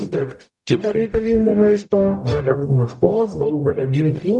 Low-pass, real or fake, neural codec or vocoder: 10.8 kHz; fake; codec, 44.1 kHz, 0.9 kbps, DAC